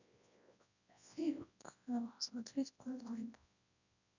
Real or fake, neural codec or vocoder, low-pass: fake; codec, 24 kHz, 0.9 kbps, WavTokenizer, large speech release; 7.2 kHz